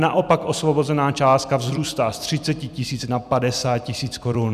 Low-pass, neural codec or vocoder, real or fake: 14.4 kHz; vocoder, 44.1 kHz, 128 mel bands every 256 samples, BigVGAN v2; fake